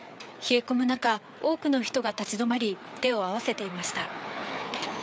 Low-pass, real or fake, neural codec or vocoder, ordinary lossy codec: none; fake; codec, 16 kHz, 4 kbps, FreqCodec, larger model; none